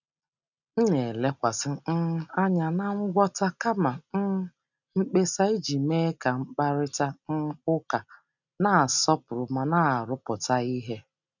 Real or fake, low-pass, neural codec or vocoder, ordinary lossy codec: real; 7.2 kHz; none; none